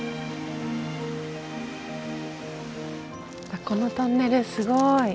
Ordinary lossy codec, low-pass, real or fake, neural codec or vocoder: none; none; real; none